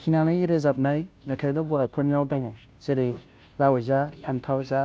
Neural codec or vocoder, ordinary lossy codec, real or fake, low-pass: codec, 16 kHz, 0.5 kbps, FunCodec, trained on Chinese and English, 25 frames a second; none; fake; none